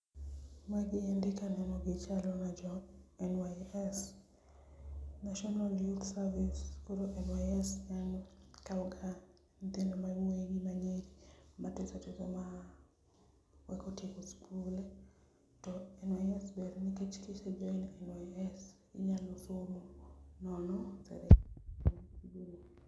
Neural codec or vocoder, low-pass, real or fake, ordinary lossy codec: none; none; real; none